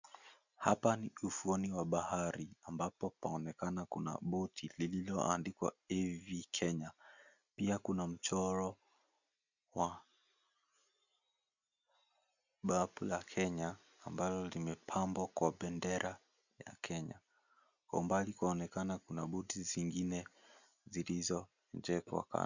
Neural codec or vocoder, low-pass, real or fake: none; 7.2 kHz; real